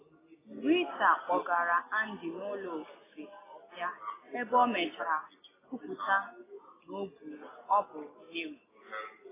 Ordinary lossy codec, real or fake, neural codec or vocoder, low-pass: AAC, 16 kbps; real; none; 3.6 kHz